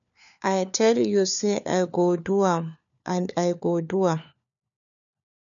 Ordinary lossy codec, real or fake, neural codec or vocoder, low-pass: none; fake; codec, 16 kHz, 4 kbps, FunCodec, trained on LibriTTS, 50 frames a second; 7.2 kHz